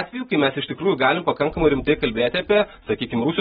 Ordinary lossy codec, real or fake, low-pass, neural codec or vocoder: AAC, 16 kbps; real; 19.8 kHz; none